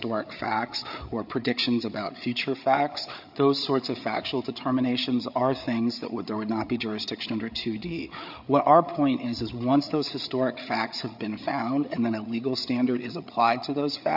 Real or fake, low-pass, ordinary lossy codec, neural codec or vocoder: fake; 5.4 kHz; AAC, 48 kbps; codec, 16 kHz, 8 kbps, FreqCodec, larger model